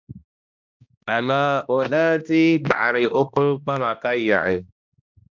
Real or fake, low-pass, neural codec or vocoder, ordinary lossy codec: fake; 7.2 kHz; codec, 16 kHz, 1 kbps, X-Codec, HuBERT features, trained on balanced general audio; MP3, 64 kbps